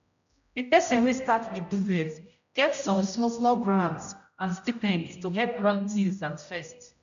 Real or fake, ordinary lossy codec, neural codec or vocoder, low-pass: fake; none; codec, 16 kHz, 0.5 kbps, X-Codec, HuBERT features, trained on general audio; 7.2 kHz